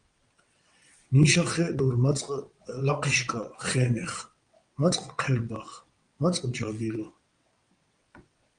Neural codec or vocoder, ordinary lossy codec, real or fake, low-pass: vocoder, 22.05 kHz, 80 mel bands, WaveNeXt; Opus, 32 kbps; fake; 9.9 kHz